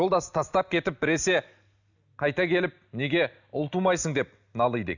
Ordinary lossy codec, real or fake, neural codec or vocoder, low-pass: none; real; none; 7.2 kHz